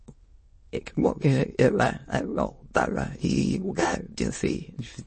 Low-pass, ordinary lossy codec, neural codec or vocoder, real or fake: 9.9 kHz; MP3, 32 kbps; autoencoder, 22.05 kHz, a latent of 192 numbers a frame, VITS, trained on many speakers; fake